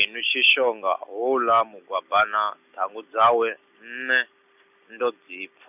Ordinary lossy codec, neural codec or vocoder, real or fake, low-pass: none; none; real; 3.6 kHz